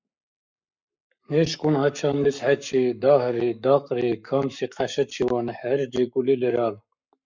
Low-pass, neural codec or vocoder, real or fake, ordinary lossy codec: 7.2 kHz; codec, 44.1 kHz, 7.8 kbps, Pupu-Codec; fake; MP3, 64 kbps